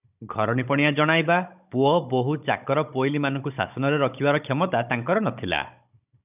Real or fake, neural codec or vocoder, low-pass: fake; codec, 16 kHz, 16 kbps, FunCodec, trained on Chinese and English, 50 frames a second; 3.6 kHz